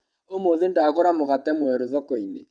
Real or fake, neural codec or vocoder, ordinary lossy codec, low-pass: fake; vocoder, 22.05 kHz, 80 mel bands, WaveNeXt; none; 9.9 kHz